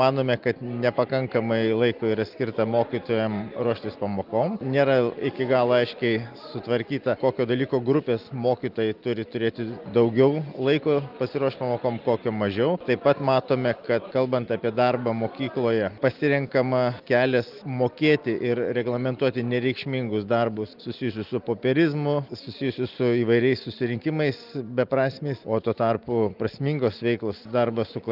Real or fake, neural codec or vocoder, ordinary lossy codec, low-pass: real; none; Opus, 32 kbps; 5.4 kHz